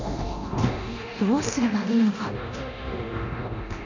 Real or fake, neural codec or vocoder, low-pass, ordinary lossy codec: fake; codec, 24 kHz, 0.9 kbps, DualCodec; 7.2 kHz; none